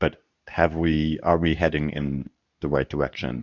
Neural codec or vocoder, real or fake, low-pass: codec, 24 kHz, 0.9 kbps, WavTokenizer, medium speech release version 2; fake; 7.2 kHz